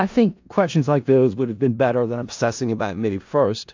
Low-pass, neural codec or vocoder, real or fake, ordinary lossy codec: 7.2 kHz; codec, 16 kHz in and 24 kHz out, 0.4 kbps, LongCat-Audio-Codec, four codebook decoder; fake; AAC, 48 kbps